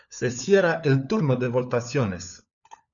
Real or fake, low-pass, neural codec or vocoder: fake; 7.2 kHz; codec, 16 kHz, 4 kbps, FunCodec, trained on LibriTTS, 50 frames a second